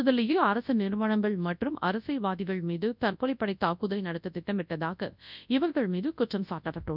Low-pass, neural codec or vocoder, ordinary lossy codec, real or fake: 5.4 kHz; codec, 24 kHz, 0.9 kbps, WavTokenizer, large speech release; none; fake